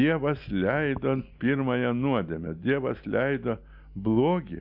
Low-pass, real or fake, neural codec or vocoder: 5.4 kHz; real; none